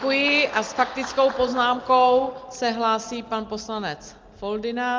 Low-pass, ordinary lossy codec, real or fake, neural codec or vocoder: 7.2 kHz; Opus, 24 kbps; real; none